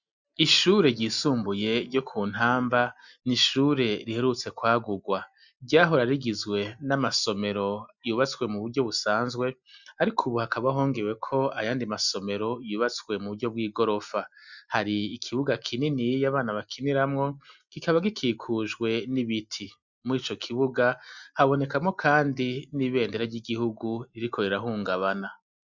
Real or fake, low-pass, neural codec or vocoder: real; 7.2 kHz; none